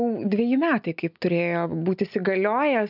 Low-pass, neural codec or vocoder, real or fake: 5.4 kHz; none; real